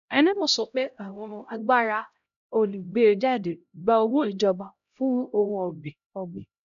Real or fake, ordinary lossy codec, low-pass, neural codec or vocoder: fake; none; 7.2 kHz; codec, 16 kHz, 0.5 kbps, X-Codec, HuBERT features, trained on LibriSpeech